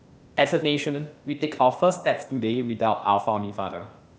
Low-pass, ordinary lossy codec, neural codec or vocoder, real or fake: none; none; codec, 16 kHz, 0.8 kbps, ZipCodec; fake